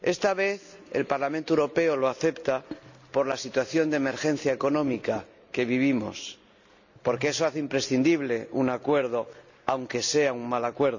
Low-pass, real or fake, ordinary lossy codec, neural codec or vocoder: 7.2 kHz; real; none; none